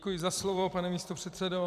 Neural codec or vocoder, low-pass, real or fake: vocoder, 44.1 kHz, 128 mel bands every 256 samples, BigVGAN v2; 14.4 kHz; fake